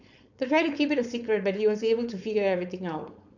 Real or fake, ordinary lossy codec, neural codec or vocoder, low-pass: fake; none; codec, 16 kHz, 4.8 kbps, FACodec; 7.2 kHz